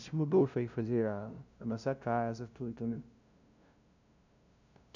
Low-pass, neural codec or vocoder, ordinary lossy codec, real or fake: 7.2 kHz; codec, 16 kHz, 0.5 kbps, FunCodec, trained on LibriTTS, 25 frames a second; none; fake